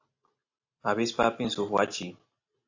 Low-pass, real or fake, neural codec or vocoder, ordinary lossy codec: 7.2 kHz; real; none; AAC, 48 kbps